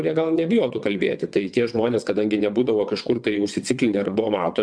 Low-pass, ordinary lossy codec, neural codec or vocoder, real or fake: 9.9 kHz; MP3, 96 kbps; vocoder, 22.05 kHz, 80 mel bands, WaveNeXt; fake